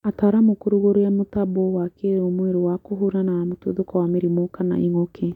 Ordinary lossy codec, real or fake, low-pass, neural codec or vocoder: MP3, 96 kbps; real; 19.8 kHz; none